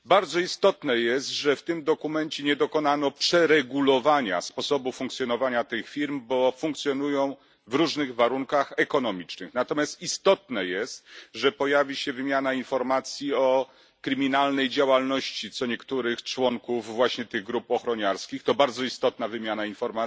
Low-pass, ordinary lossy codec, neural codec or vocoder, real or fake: none; none; none; real